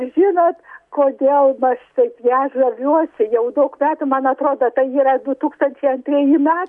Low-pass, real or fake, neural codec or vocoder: 10.8 kHz; real; none